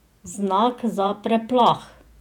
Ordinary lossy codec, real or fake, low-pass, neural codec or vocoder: none; fake; 19.8 kHz; vocoder, 48 kHz, 128 mel bands, Vocos